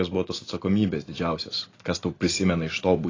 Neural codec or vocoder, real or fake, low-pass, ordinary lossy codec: none; real; 7.2 kHz; AAC, 32 kbps